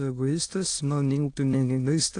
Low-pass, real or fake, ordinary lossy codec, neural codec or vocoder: 9.9 kHz; fake; AAC, 48 kbps; autoencoder, 22.05 kHz, a latent of 192 numbers a frame, VITS, trained on many speakers